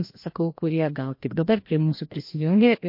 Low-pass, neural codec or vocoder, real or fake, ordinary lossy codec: 5.4 kHz; codec, 16 kHz, 1 kbps, FreqCodec, larger model; fake; MP3, 32 kbps